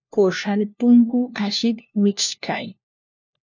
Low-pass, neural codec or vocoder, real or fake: 7.2 kHz; codec, 16 kHz, 1 kbps, FunCodec, trained on LibriTTS, 50 frames a second; fake